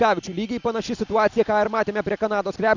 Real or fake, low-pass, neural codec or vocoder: real; 7.2 kHz; none